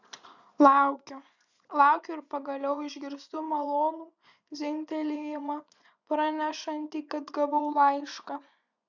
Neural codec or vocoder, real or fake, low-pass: vocoder, 24 kHz, 100 mel bands, Vocos; fake; 7.2 kHz